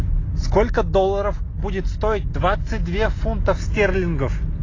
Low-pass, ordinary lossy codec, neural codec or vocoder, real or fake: 7.2 kHz; AAC, 32 kbps; none; real